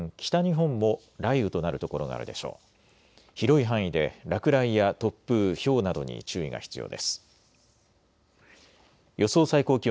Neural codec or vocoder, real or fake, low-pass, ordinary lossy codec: none; real; none; none